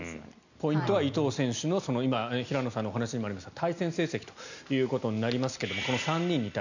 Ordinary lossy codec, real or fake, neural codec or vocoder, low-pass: none; real; none; 7.2 kHz